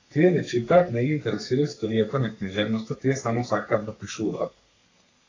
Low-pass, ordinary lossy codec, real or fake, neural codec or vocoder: 7.2 kHz; AAC, 32 kbps; fake; codec, 32 kHz, 1.9 kbps, SNAC